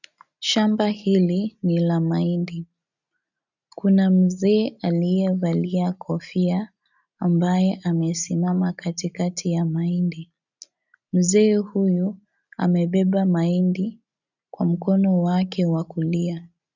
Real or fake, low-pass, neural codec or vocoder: real; 7.2 kHz; none